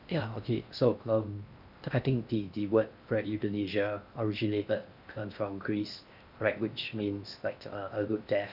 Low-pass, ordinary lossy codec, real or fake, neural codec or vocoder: 5.4 kHz; none; fake; codec, 16 kHz in and 24 kHz out, 0.6 kbps, FocalCodec, streaming, 4096 codes